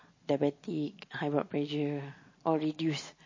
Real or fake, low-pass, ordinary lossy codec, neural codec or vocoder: real; 7.2 kHz; MP3, 32 kbps; none